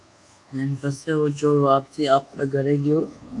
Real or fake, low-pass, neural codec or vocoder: fake; 10.8 kHz; codec, 24 kHz, 1.2 kbps, DualCodec